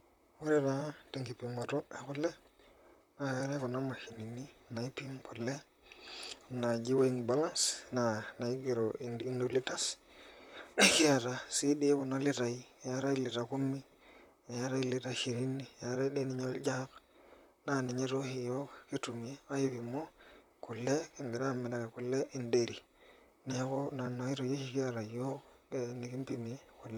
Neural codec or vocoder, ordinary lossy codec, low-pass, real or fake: vocoder, 44.1 kHz, 128 mel bands, Pupu-Vocoder; none; 19.8 kHz; fake